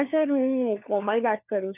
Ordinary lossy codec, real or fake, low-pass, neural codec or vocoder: none; fake; 3.6 kHz; codec, 16 kHz, 4 kbps, FunCodec, trained on LibriTTS, 50 frames a second